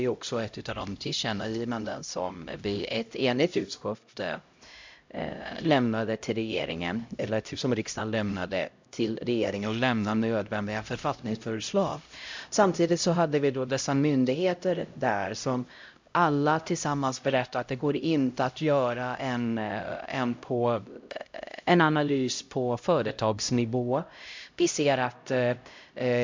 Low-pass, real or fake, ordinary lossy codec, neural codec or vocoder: 7.2 kHz; fake; MP3, 64 kbps; codec, 16 kHz, 0.5 kbps, X-Codec, HuBERT features, trained on LibriSpeech